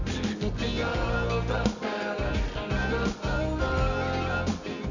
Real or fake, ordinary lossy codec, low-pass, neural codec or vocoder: fake; none; 7.2 kHz; codec, 24 kHz, 0.9 kbps, WavTokenizer, medium music audio release